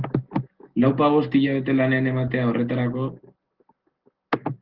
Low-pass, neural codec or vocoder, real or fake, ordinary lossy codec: 5.4 kHz; none; real; Opus, 16 kbps